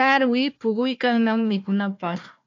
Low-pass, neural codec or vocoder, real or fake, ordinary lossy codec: 7.2 kHz; codec, 16 kHz, 1 kbps, FunCodec, trained on Chinese and English, 50 frames a second; fake; AAC, 48 kbps